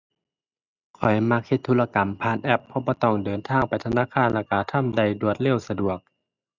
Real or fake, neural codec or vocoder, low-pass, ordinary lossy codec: real; none; 7.2 kHz; none